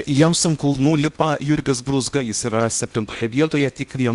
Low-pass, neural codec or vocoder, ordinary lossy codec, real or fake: 10.8 kHz; codec, 16 kHz in and 24 kHz out, 0.8 kbps, FocalCodec, streaming, 65536 codes; Opus, 64 kbps; fake